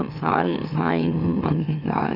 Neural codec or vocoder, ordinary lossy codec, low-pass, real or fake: autoencoder, 44.1 kHz, a latent of 192 numbers a frame, MeloTTS; none; 5.4 kHz; fake